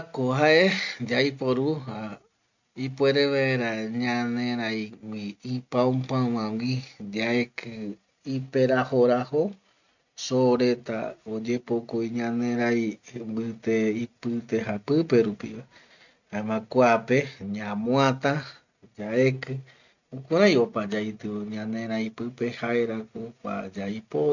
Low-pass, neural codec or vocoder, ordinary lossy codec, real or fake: 7.2 kHz; none; none; real